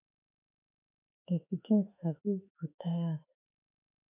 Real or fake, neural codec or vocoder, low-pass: fake; autoencoder, 48 kHz, 32 numbers a frame, DAC-VAE, trained on Japanese speech; 3.6 kHz